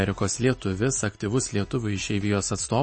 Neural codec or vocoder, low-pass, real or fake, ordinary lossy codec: none; 9.9 kHz; real; MP3, 32 kbps